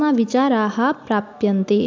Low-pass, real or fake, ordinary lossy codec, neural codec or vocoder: 7.2 kHz; real; MP3, 64 kbps; none